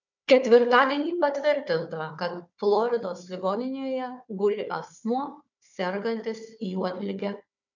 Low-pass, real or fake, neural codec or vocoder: 7.2 kHz; fake; codec, 16 kHz, 4 kbps, FunCodec, trained on Chinese and English, 50 frames a second